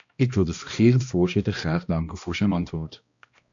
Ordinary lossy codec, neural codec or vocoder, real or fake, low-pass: AAC, 64 kbps; codec, 16 kHz, 1 kbps, X-Codec, HuBERT features, trained on balanced general audio; fake; 7.2 kHz